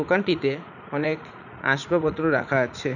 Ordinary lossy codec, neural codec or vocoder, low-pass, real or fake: none; vocoder, 44.1 kHz, 80 mel bands, Vocos; 7.2 kHz; fake